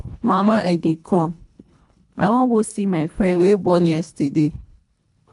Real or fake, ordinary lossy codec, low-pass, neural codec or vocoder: fake; none; 10.8 kHz; codec, 24 kHz, 1.5 kbps, HILCodec